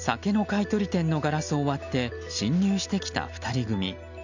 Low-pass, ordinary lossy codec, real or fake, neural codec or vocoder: 7.2 kHz; none; real; none